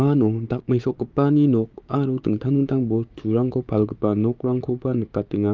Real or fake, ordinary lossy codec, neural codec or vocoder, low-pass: fake; Opus, 32 kbps; codec, 16 kHz, 6 kbps, DAC; 7.2 kHz